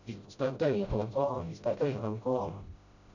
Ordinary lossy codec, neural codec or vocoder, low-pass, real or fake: none; codec, 16 kHz, 0.5 kbps, FreqCodec, smaller model; 7.2 kHz; fake